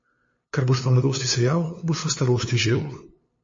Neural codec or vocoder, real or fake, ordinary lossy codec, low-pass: codec, 16 kHz, 2 kbps, FunCodec, trained on LibriTTS, 25 frames a second; fake; MP3, 32 kbps; 7.2 kHz